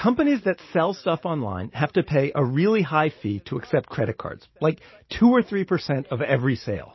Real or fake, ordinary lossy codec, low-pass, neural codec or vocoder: real; MP3, 24 kbps; 7.2 kHz; none